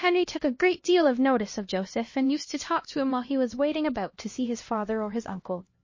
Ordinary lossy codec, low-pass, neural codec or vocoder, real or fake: MP3, 32 kbps; 7.2 kHz; codec, 16 kHz, 1 kbps, X-Codec, HuBERT features, trained on LibriSpeech; fake